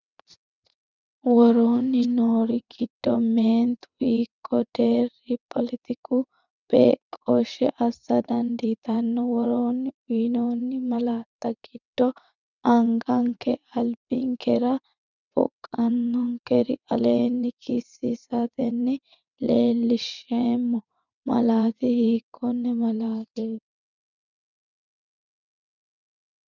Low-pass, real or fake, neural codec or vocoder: 7.2 kHz; fake; vocoder, 22.05 kHz, 80 mel bands, WaveNeXt